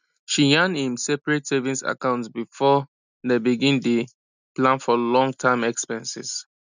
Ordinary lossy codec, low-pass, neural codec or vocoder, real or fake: none; 7.2 kHz; none; real